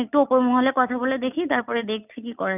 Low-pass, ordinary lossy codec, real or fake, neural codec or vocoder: 3.6 kHz; none; real; none